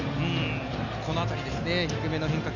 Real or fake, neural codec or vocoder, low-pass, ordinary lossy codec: real; none; 7.2 kHz; none